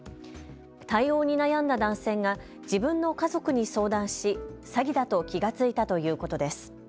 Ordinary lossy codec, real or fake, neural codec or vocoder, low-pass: none; real; none; none